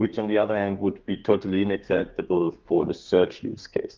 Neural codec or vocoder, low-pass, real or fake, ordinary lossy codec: codec, 32 kHz, 1.9 kbps, SNAC; 7.2 kHz; fake; Opus, 32 kbps